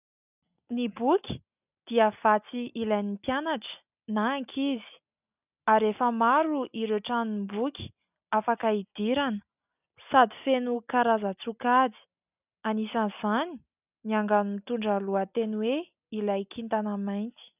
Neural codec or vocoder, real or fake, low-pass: none; real; 3.6 kHz